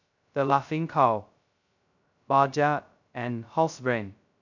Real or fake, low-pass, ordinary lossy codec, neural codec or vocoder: fake; 7.2 kHz; none; codec, 16 kHz, 0.2 kbps, FocalCodec